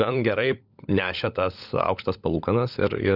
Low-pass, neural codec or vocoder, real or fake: 5.4 kHz; codec, 16 kHz, 16 kbps, FunCodec, trained on LibriTTS, 50 frames a second; fake